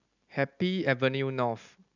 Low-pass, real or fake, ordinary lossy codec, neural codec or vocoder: 7.2 kHz; real; none; none